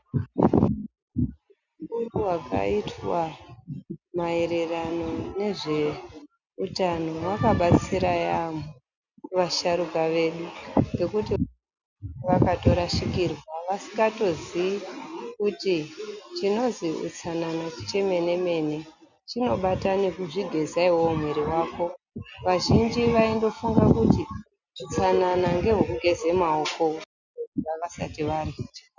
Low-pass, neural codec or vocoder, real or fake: 7.2 kHz; none; real